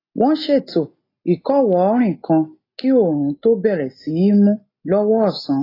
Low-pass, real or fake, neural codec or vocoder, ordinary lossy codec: 5.4 kHz; real; none; AAC, 24 kbps